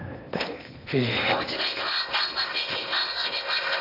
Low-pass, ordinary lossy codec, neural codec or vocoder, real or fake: 5.4 kHz; none; codec, 16 kHz in and 24 kHz out, 0.8 kbps, FocalCodec, streaming, 65536 codes; fake